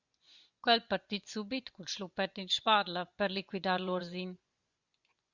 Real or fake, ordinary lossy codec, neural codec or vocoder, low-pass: fake; Opus, 64 kbps; vocoder, 24 kHz, 100 mel bands, Vocos; 7.2 kHz